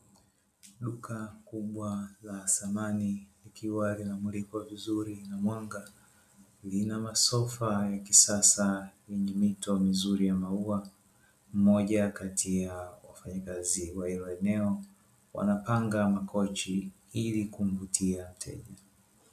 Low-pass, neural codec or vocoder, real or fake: 14.4 kHz; none; real